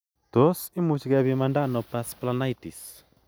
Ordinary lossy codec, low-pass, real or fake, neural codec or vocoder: none; none; real; none